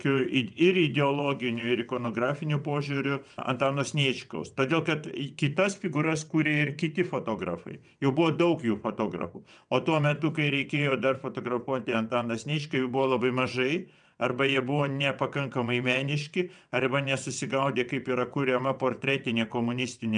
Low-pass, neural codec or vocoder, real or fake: 9.9 kHz; vocoder, 22.05 kHz, 80 mel bands, WaveNeXt; fake